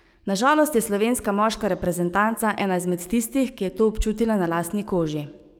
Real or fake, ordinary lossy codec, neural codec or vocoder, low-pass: fake; none; codec, 44.1 kHz, 7.8 kbps, DAC; none